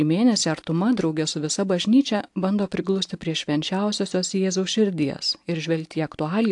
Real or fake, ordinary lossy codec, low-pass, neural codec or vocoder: real; AAC, 64 kbps; 10.8 kHz; none